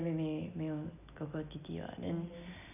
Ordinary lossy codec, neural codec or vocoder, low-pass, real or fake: none; none; 3.6 kHz; real